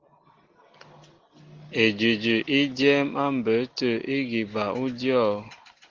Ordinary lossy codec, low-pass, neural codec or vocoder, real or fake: Opus, 16 kbps; 7.2 kHz; none; real